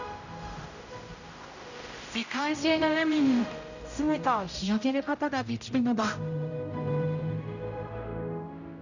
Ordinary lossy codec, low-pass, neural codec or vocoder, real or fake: none; 7.2 kHz; codec, 16 kHz, 0.5 kbps, X-Codec, HuBERT features, trained on general audio; fake